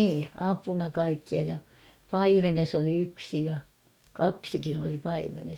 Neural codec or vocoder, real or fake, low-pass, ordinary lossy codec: codec, 44.1 kHz, 2.6 kbps, DAC; fake; 19.8 kHz; none